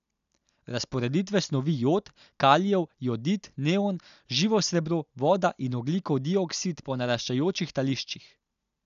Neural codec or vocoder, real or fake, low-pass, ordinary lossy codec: none; real; 7.2 kHz; none